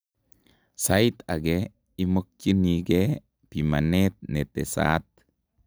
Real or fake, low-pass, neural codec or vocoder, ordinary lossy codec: real; none; none; none